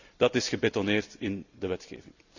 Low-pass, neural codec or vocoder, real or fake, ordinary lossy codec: 7.2 kHz; vocoder, 44.1 kHz, 128 mel bands every 256 samples, BigVGAN v2; fake; none